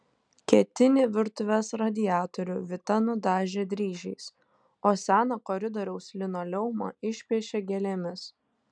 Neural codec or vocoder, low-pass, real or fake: none; 9.9 kHz; real